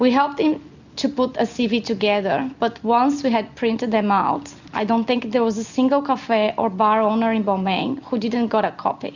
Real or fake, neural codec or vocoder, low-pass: real; none; 7.2 kHz